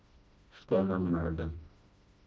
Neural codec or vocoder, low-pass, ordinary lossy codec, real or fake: codec, 16 kHz, 1 kbps, FreqCodec, smaller model; none; none; fake